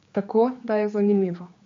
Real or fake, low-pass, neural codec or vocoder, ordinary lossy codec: fake; 7.2 kHz; codec, 16 kHz, 4 kbps, X-Codec, HuBERT features, trained on general audio; MP3, 48 kbps